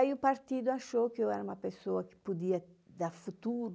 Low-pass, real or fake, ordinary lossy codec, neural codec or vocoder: none; real; none; none